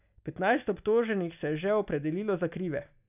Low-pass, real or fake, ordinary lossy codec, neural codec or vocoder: 3.6 kHz; real; none; none